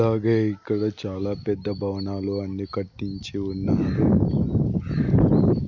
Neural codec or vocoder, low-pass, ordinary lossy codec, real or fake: none; 7.2 kHz; none; real